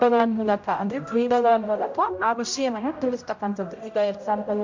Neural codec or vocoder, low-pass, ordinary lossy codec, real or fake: codec, 16 kHz, 0.5 kbps, X-Codec, HuBERT features, trained on general audio; 7.2 kHz; MP3, 48 kbps; fake